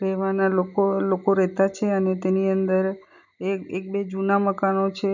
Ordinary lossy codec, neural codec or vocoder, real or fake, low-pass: none; none; real; 7.2 kHz